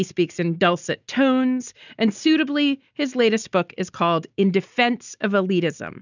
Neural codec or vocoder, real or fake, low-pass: none; real; 7.2 kHz